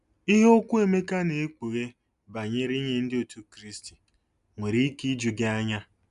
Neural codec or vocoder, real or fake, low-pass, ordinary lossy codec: none; real; 10.8 kHz; AAC, 96 kbps